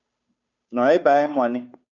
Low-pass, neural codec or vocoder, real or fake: 7.2 kHz; codec, 16 kHz, 2 kbps, FunCodec, trained on Chinese and English, 25 frames a second; fake